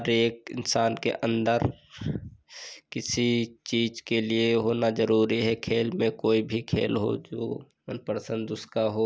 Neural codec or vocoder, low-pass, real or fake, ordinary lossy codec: none; none; real; none